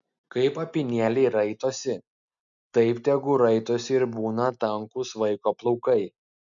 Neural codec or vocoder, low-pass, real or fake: none; 7.2 kHz; real